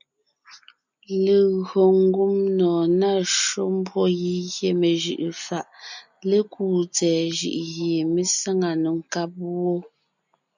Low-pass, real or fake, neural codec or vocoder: 7.2 kHz; real; none